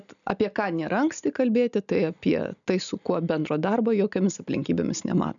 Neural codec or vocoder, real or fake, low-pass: none; real; 7.2 kHz